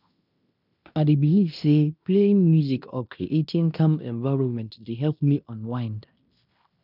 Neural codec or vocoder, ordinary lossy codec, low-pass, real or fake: codec, 16 kHz in and 24 kHz out, 0.9 kbps, LongCat-Audio-Codec, fine tuned four codebook decoder; none; 5.4 kHz; fake